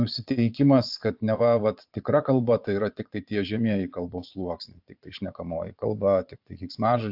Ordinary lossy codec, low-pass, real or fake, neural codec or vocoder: AAC, 48 kbps; 5.4 kHz; fake; vocoder, 22.05 kHz, 80 mel bands, Vocos